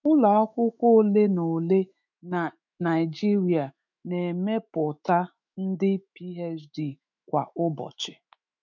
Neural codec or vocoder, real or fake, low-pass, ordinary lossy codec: autoencoder, 48 kHz, 128 numbers a frame, DAC-VAE, trained on Japanese speech; fake; 7.2 kHz; none